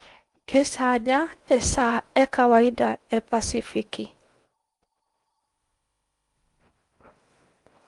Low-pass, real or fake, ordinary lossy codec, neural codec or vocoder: 10.8 kHz; fake; Opus, 32 kbps; codec, 16 kHz in and 24 kHz out, 0.8 kbps, FocalCodec, streaming, 65536 codes